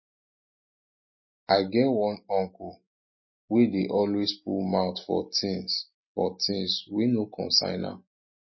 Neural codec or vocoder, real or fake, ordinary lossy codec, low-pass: none; real; MP3, 24 kbps; 7.2 kHz